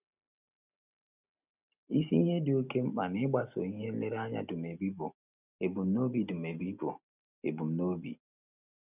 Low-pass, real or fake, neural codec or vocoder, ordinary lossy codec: 3.6 kHz; real; none; none